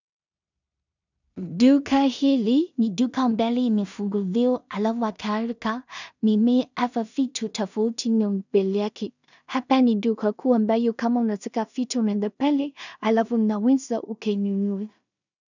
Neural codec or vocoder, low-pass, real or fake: codec, 16 kHz in and 24 kHz out, 0.4 kbps, LongCat-Audio-Codec, two codebook decoder; 7.2 kHz; fake